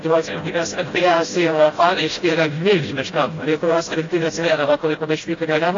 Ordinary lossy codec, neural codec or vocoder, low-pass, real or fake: AAC, 32 kbps; codec, 16 kHz, 0.5 kbps, FreqCodec, smaller model; 7.2 kHz; fake